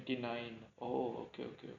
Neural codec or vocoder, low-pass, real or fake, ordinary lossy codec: none; 7.2 kHz; real; none